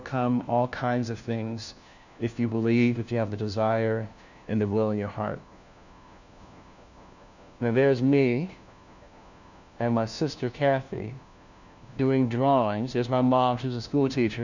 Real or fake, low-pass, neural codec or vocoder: fake; 7.2 kHz; codec, 16 kHz, 1 kbps, FunCodec, trained on LibriTTS, 50 frames a second